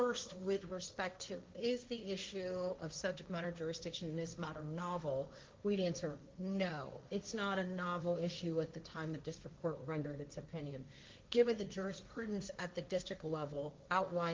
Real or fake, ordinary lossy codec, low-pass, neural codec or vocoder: fake; Opus, 32 kbps; 7.2 kHz; codec, 16 kHz, 1.1 kbps, Voila-Tokenizer